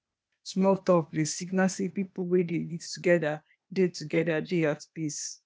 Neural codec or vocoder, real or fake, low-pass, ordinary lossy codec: codec, 16 kHz, 0.8 kbps, ZipCodec; fake; none; none